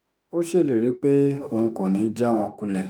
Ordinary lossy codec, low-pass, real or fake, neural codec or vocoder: none; none; fake; autoencoder, 48 kHz, 32 numbers a frame, DAC-VAE, trained on Japanese speech